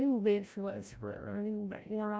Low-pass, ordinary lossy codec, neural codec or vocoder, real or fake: none; none; codec, 16 kHz, 0.5 kbps, FreqCodec, larger model; fake